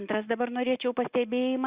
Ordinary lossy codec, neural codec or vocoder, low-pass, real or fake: Opus, 64 kbps; none; 3.6 kHz; real